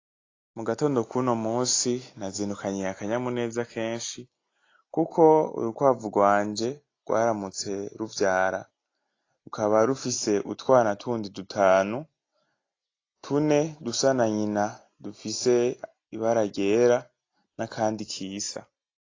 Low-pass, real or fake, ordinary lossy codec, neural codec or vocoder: 7.2 kHz; real; AAC, 32 kbps; none